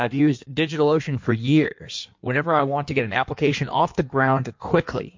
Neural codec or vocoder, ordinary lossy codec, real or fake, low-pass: codec, 16 kHz in and 24 kHz out, 1.1 kbps, FireRedTTS-2 codec; MP3, 48 kbps; fake; 7.2 kHz